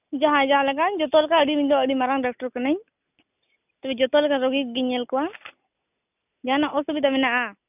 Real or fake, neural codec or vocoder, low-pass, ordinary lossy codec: real; none; 3.6 kHz; none